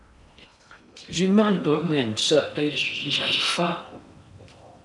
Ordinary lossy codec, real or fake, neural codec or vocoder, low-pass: MP3, 96 kbps; fake; codec, 16 kHz in and 24 kHz out, 0.6 kbps, FocalCodec, streaming, 4096 codes; 10.8 kHz